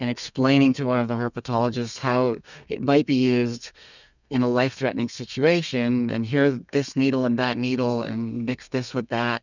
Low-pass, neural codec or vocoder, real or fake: 7.2 kHz; codec, 32 kHz, 1.9 kbps, SNAC; fake